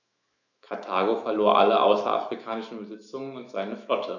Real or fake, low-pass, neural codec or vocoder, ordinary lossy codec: fake; 7.2 kHz; autoencoder, 48 kHz, 128 numbers a frame, DAC-VAE, trained on Japanese speech; none